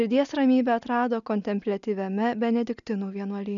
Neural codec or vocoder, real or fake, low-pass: none; real; 7.2 kHz